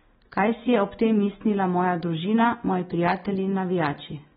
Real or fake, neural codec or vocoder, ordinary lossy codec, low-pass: real; none; AAC, 16 kbps; 19.8 kHz